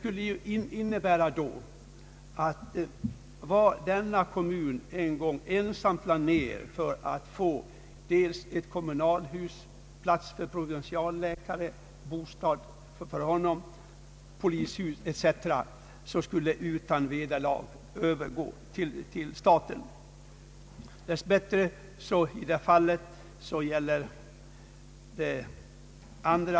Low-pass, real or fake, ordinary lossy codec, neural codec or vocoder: none; real; none; none